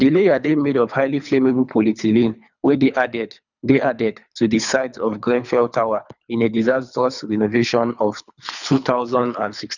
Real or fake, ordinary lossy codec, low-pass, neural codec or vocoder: fake; none; 7.2 kHz; codec, 24 kHz, 3 kbps, HILCodec